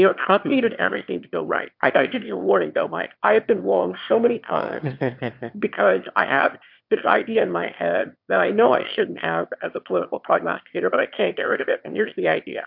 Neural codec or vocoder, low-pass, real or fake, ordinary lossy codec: autoencoder, 22.05 kHz, a latent of 192 numbers a frame, VITS, trained on one speaker; 5.4 kHz; fake; MP3, 48 kbps